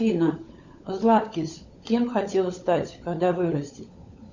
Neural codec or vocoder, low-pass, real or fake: codec, 16 kHz, 16 kbps, FunCodec, trained on LibriTTS, 50 frames a second; 7.2 kHz; fake